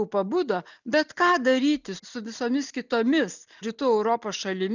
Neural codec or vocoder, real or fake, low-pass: none; real; 7.2 kHz